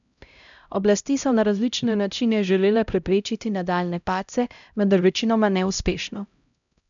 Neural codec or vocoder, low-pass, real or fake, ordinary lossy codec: codec, 16 kHz, 0.5 kbps, X-Codec, HuBERT features, trained on LibriSpeech; 7.2 kHz; fake; none